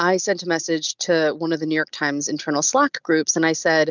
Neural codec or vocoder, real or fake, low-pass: none; real; 7.2 kHz